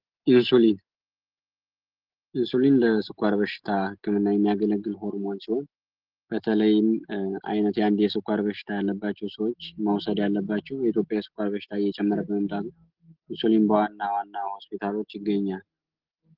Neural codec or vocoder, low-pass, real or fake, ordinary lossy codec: none; 5.4 kHz; real; Opus, 16 kbps